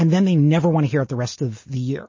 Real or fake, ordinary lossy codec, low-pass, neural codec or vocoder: real; MP3, 32 kbps; 7.2 kHz; none